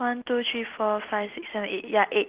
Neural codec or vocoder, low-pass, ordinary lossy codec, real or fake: none; 3.6 kHz; Opus, 16 kbps; real